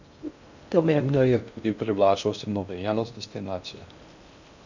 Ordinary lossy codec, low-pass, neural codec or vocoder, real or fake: none; 7.2 kHz; codec, 16 kHz in and 24 kHz out, 0.6 kbps, FocalCodec, streaming, 2048 codes; fake